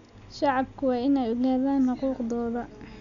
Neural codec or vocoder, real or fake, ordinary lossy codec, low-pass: none; real; none; 7.2 kHz